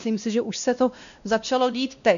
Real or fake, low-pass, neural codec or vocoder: fake; 7.2 kHz; codec, 16 kHz, 1 kbps, X-Codec, WavLM features, trained on Multilingual LibriSpeech